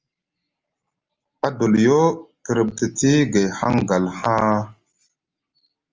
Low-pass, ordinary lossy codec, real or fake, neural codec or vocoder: 7.2 kHz; Opus, 32 kbps; real; none